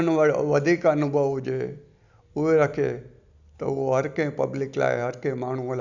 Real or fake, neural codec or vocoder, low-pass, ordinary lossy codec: real; none; 7.2 kHz; Opus, 64 kbps